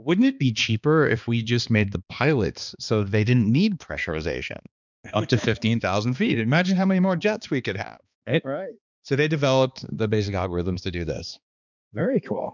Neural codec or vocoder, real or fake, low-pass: codec, 16 kHz, 2 kbps, X-Codec, HuBERT features, trained on balanced general audio; fake; 7.2 kHz